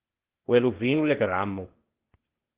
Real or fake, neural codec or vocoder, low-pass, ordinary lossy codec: fake; codec, 16 kHz, 0.8 kbps, ZipCodec; 3.6 kHz; Opus, 16 kbps